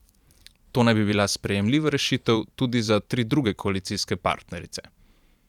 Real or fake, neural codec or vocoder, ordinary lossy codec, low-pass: fake; vocoder, 44.1 kHz, 128 mel bands every 512 samples, BigVGAN v2; none; 19.8 kHz